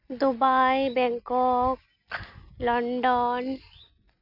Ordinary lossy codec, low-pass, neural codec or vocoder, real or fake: none; 5.4 kHz; none; real